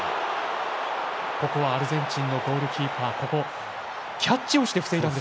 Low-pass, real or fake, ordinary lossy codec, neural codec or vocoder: none; real; none; none